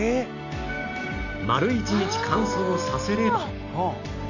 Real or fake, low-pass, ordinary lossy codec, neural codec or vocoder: real; 7.2 kHz; none; none